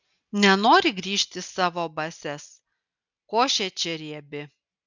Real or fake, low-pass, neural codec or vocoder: real; 7.2 kHz; none